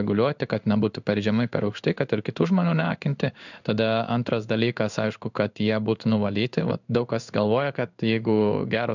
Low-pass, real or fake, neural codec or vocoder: 7.2 kHz; fake; codec, 16 kHz in and 24 kHz out, 1 kbps, XY-Tokenizer